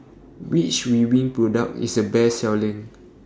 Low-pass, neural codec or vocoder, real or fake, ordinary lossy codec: none; none; real; none